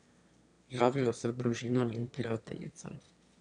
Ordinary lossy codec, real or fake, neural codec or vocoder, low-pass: none; fake; autoencoder, 22.05 kHz, a latent of 192 numbers a frame, VITS, trained on one speaker; 9.9 kHz